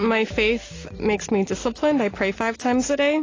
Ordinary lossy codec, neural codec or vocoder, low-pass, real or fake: AAC, 32 kbps; none; 7.2 kHz; real